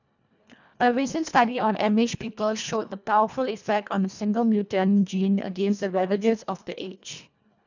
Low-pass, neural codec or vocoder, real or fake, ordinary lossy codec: 7.2 kHz; codec, 24 kHz, 1.5 kbps, HILCodec; fake; none